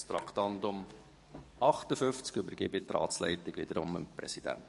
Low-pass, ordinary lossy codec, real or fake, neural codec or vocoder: 14.4 kHz; MP3, 48 kbps; fake; codec, 44.1 kHz, 7.8 kbps, DAC